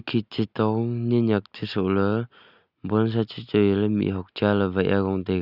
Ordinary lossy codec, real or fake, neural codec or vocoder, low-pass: Opus, 64 kbps; real; none; 5.4 kHz